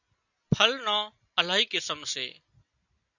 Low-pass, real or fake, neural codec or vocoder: 7.2 kHz; real; none